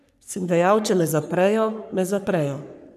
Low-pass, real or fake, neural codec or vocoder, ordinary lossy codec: 14.4 kHz; fake; codec, 44.1 kHz, 3.4 kbps, Pupu-Codec; none